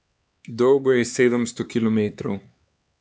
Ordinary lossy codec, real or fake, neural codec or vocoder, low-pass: none; fake; codec, 16 kHz, 4 kbps, X-Codec, HuBERT features, trained on LibriSpeech; none